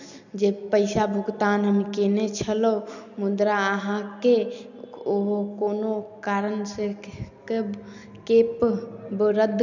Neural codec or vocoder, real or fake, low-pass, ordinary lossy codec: none; real; 7.2 kHz; none